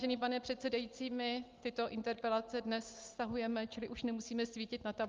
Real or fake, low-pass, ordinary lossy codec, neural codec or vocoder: real; 7.2 kHz; Opus, 32 kbps; none